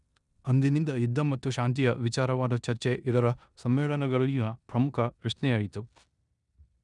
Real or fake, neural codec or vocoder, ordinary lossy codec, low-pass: fake; codec, 16 kHz in and 24 kHz out, 0.9 kbps, LongCat-Audio-Codec, four codebook decoder; none; 10.8 kHz